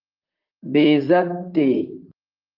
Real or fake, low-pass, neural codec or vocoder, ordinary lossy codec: fake; 5.4 kHz; vocoder, 44.1 kHz, 128 mel bands, Pupu-Vocoder; Opus, 24 kbps